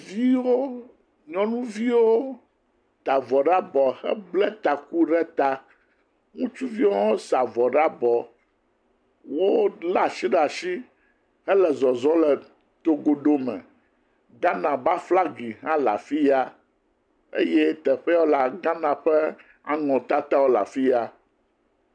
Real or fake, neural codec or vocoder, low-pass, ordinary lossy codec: real; none; 9.9 kHz; MP3, 96 kbps